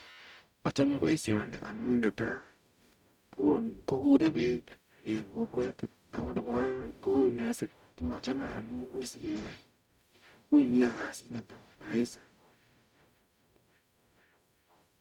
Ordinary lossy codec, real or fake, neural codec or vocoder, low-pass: none; fake; codec, 44.1 kHz, 0.9 kbps, DAC; 19.8 kHz